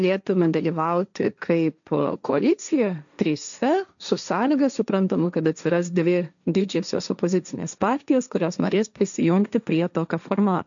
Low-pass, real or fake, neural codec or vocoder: 7.2 kHz; fake; codec, 16 kHz, 1.1 kbps, Voila-Tokenizer